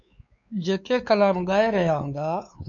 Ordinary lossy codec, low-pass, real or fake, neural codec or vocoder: MP3, 64 kbps; 7.2 kHz; fake; codec, 16 kHz, 4 kbps, X-Codec, WavLM features, trained on Multilingual LibriSpeech